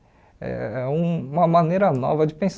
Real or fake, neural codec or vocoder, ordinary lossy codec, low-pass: real; none; none; none